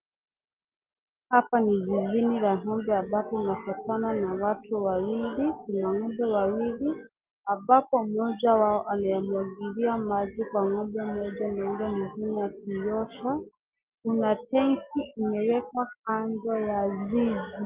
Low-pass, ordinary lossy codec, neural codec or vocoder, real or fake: 3.6 kHz; Opus, 32 kbps; none; real